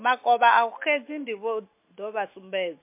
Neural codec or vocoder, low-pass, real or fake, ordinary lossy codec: none; 3.6 kHz; real; MP3, 24 kbps